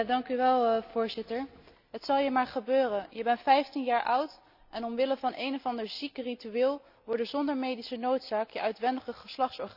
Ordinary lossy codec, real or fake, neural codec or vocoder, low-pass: none; real; none; 5.4 kHz